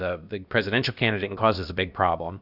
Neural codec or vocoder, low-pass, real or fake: codec, 16 kHz, about 1 kbps, DyCAST, with the encoder's durations; 5.4 kHz; fake